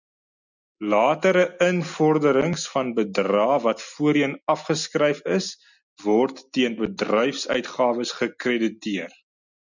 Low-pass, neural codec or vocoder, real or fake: 7.2 kHz; none; real